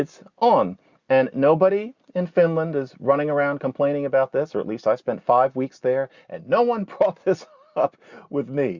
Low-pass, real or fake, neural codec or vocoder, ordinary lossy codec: 7.2 kHz; real; none; Opus, 64 kbps